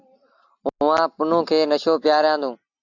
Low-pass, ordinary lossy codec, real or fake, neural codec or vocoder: 7.2 kHz; AAC, 48 kbps; real; none